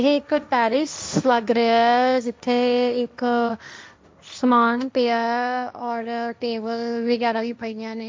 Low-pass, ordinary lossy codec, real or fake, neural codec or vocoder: none; none; fake; codec, 16 kHz, 1.1 kbps, Voila-Tokenizer